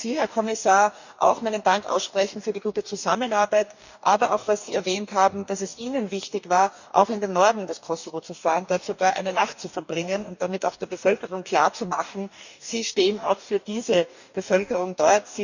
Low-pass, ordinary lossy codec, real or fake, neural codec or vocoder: 7.2 kHz; none; fake; codec, 44.1 kHz, 2.6 kbps, DAC